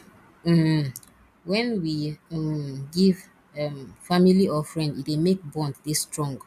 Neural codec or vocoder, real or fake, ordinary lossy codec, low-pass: none; real; none; 14.4 kHz